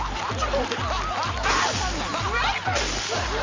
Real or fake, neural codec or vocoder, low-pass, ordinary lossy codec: real; none; 7.2 kHz; Opus, 32 kbps